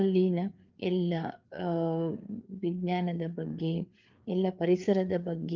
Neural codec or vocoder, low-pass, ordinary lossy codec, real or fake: codec, 16 kHz, 4 kbps, FreqCodec, larger model; 7.2 kHz; Opus, 32 kbps; fake